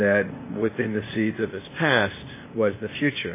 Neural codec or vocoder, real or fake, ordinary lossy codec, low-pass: codec, 16 kHz, 0.8 kbps, ZipCodec; fake; MP3, 16 kbps; 3.6 kHz